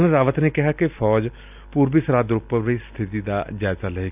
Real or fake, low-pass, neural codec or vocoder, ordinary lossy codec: real; 3.6 kHz; none; none